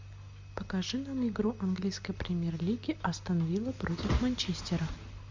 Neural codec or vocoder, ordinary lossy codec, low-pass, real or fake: none; MP3, 64 kbps; 7.2 kHz; real